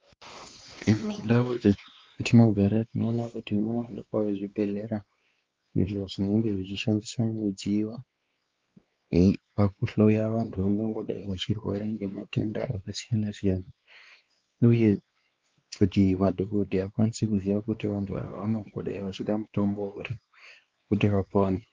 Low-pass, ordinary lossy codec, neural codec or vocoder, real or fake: 7.2 kHz; Opus, 24 kbps; codec, 16 kHz, 2 kbps, X-Codec, WavLM features, trained on Multilingual LibriSpeech; fake